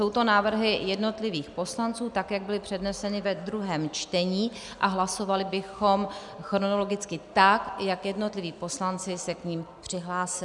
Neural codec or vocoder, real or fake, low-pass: none; real; 10.8 kHz